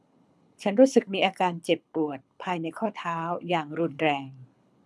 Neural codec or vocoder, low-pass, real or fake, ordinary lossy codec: codec, 24 kHz, 6 kbps, HILCodec; none; fake; none